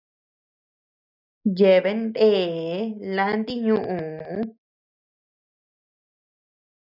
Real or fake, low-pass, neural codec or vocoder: real; 5.4 kHz; none